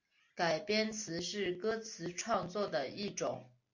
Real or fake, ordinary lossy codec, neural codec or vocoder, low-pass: real; MP3, 48 kbps; none; 7.2 kHz